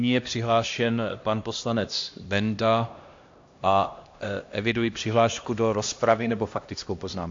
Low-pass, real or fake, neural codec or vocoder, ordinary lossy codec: 7.2 kHz; fake; codec, 16 kHz, 1 kbps, X-Codec, HuBERT features, trained on LibriSpeech; AAC, 48 kbps